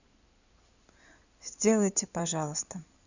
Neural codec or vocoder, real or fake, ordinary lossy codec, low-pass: codec, 16 kHz in and 24 kHz out, 2.2 kbps, FireRedTTS-2 codec; fake; none; 7.2 kHz